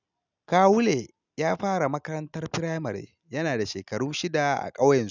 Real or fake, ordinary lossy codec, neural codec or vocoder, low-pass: real; none; none; 7.2 kHz